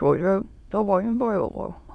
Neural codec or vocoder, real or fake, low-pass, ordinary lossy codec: autoencoder, 22.05 kHz, a latent of 192 numbers a frame, VITS, trained on many speakers; fake; none; none